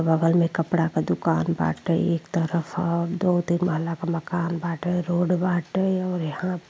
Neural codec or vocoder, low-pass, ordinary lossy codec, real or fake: none; none; none; real